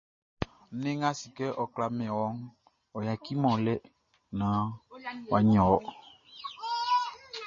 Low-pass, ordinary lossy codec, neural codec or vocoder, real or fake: 7.2 kHz; MP3, 32 kbps; none; real